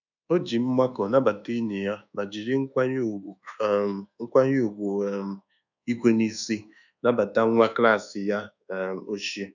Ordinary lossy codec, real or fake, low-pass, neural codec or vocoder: none; fake; 7.2 kHz; codec, 24 kHz, 1.2 kbps, DualCodec